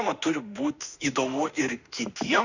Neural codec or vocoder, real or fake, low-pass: autoencoder, 48 kHz, 32 numbers a frame, DAC-VAE, trained on Japanese speech; fake; 7.2 kHz